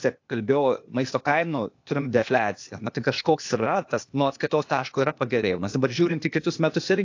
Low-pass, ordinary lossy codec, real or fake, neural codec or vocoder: 7.2 kHz; AAC, 48 kbps; fake; codec, 16 kHz, 0.8 kbps, ZipCodec